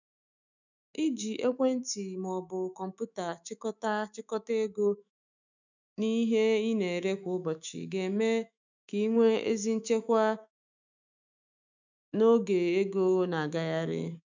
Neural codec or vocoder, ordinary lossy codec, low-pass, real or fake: autoencoder, 48 kHz, 128 numbers a frame, DAC-VAE, trained on Japanese speech; none; 7.2 kHz; fake